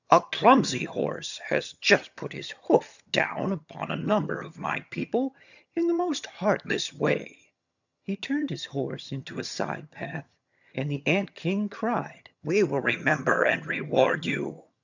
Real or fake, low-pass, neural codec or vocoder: fake; 7.2 kHz; vocoder, 22.05 kHz, 80 mel bands, HiFi-GAN